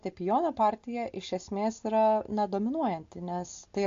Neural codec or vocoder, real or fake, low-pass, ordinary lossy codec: none; real; 7.2 kHz; AAC, 48 kbps